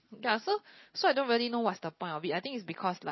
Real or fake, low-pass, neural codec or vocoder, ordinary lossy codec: real; 7.2 kHz; none; MP3, 24 kbps